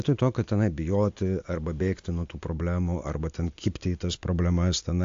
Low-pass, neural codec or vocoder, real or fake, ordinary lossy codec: 7.2 kHz; none; real; AAC, 48 kbps